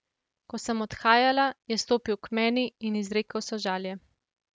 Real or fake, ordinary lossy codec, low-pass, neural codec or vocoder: real; none; none; none